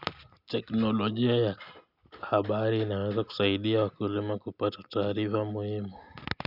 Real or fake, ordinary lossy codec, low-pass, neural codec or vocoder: real; none; 5.4 kHz; none